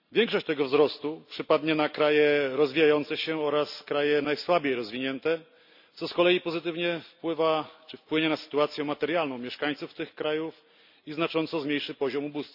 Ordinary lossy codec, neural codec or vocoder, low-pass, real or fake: none; none; 5.4 kHz; real